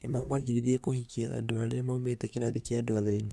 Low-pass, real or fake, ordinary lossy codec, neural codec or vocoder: none; fake; none; codec, 24 kHz, 1 kbps, SNAC